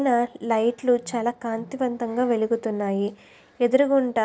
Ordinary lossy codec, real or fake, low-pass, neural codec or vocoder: none; real; none; none